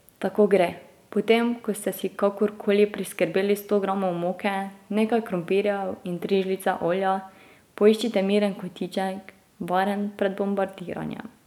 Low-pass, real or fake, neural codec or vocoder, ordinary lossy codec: 19.8 kHz; real; none; none